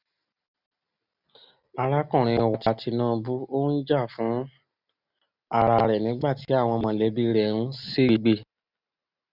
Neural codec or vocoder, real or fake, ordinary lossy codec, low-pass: none; real; none; 5.4 kHz